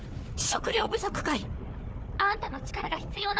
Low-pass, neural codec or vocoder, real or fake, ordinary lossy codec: none; codec, 16 kHz, 4 kbps, FunCodec, trained on Chinese and English, 50 frames a second; fake; none